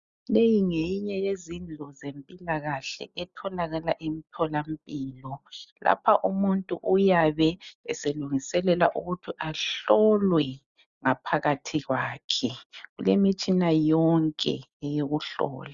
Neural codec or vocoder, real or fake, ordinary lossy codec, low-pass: none; real; Opus, 64 kbps; 7.2 kHz